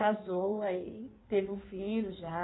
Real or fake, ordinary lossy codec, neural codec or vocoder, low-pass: fake; AAC, 16 kbps; codec, 16 kHz in and 24 kHz out, 1.1 kbps, FireRedTTS-2 codec; 7.2 kHz